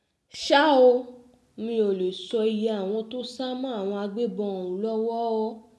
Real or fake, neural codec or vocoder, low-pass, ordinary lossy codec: real; none; none; none